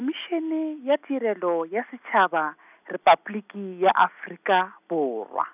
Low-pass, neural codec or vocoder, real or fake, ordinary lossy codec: 3.6 kHz; none; real; none